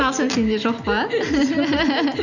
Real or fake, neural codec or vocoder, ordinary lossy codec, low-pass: real; none; none; 7.2 kHz